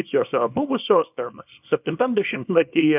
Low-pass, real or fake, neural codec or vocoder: 3.6 kHz; fake; codec, 24 kHz, 0.9 kbps, WavTokenizer, small release